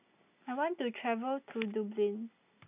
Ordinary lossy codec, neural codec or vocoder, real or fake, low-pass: none; none; real; 3.6 kHz